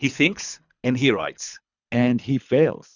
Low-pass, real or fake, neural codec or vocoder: 7.2 kHz; fake; codec, 24 kHz, 3 kbps, HILCodec